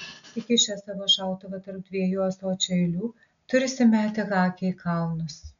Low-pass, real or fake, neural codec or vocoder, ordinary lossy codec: 7.2 kHz; real; none; MP3, 96 kbps